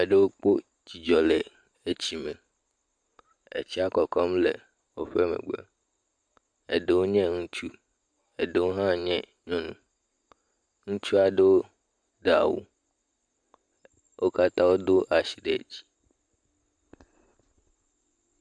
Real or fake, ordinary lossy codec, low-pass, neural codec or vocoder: real; MP3, 64 kbps; 9.9 kHz; none